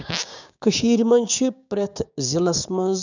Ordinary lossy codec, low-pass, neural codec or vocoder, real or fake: none; 7.2 kHz; codec, 16 kHz, 6 kbps, DAC; fake